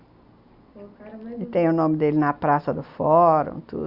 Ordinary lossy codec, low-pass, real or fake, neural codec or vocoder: Opus, 64 kbps; 5.4 kHz; real; none